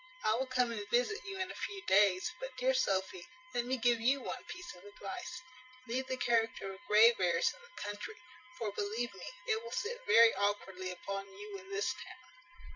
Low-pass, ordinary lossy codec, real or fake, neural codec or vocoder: 7.2 kHz; Opus, 64 kbps; fake; vocoder, 44.1 kHz, 128 mel bands every 512 samples, BigVGAN v2